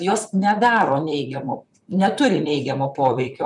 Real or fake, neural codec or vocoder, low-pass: fake; vocoder, 44.1 kHz, 128 mel bands, Pupu-Vocoder; 10.8 kHz